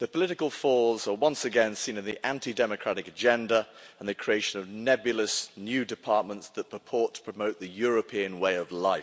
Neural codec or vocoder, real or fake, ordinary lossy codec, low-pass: none; real; none; none